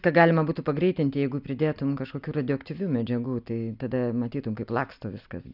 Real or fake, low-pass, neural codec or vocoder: real; 5.4 kHz; none